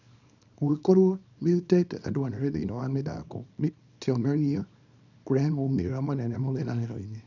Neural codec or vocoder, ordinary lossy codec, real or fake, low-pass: codec, 24 kHz, 0.9 kbps, WavTokenizer, small release; none; fake; 7.2 kHz